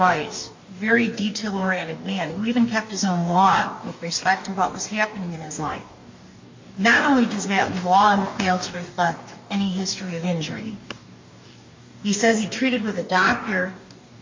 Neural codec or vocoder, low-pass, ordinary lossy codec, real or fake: codec, 44.1 kHz, 2.6 kbps, DAC; 7.2 kHz; MP3, 48 kbps; fake